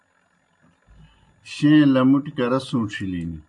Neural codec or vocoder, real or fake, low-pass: none; real; 10.8 kHz